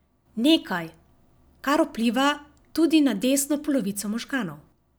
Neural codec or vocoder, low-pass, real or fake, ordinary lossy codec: none; none; real; none